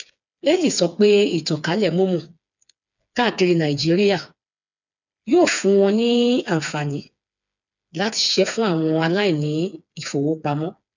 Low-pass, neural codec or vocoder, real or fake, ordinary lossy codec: 7.2 kHz; codec, 16 kHz, 4 kbps, FreqCodec, smaller model; fake; none